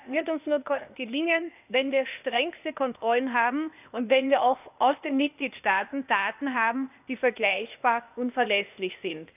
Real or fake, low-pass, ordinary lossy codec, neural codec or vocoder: fake; 3.6 kHz; none; codec, 16 kHz, 0.8 kbps, ZipCodec